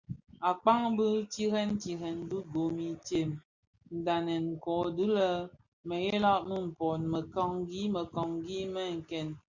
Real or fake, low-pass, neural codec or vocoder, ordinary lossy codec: real; 7.2 kHz; none; Opus, 64 kbps